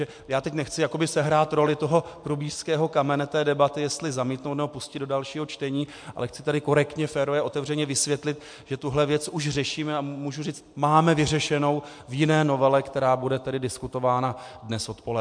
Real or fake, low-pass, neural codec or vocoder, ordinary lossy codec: real; 9.9 kHz; none; MP3, 64 kbps